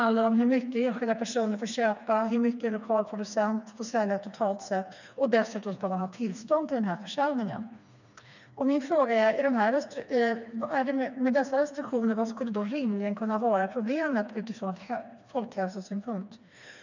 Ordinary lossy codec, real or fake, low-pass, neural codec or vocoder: none; fake; 7.2 kHz; codec, 16 kHz, 2 kbps, FreqCodec, smaller model